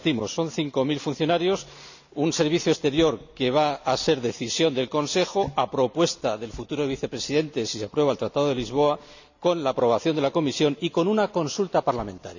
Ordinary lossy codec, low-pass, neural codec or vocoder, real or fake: none; 7.2 kHz; none; real